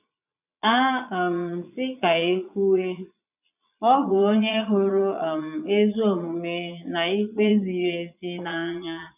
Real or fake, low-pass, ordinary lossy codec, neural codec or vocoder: fake; 3.6 kHz; none; vocoder, 22.05 kHz, 80 mel bands, Vocos